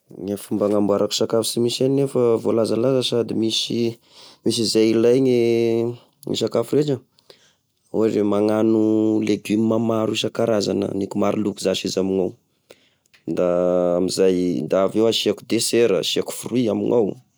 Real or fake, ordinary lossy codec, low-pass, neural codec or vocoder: real; none; none; none